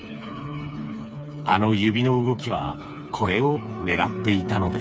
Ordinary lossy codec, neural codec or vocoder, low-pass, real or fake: none; codec, 16 kHz, 4 kbps, FreqCodec, smaller model; none; fake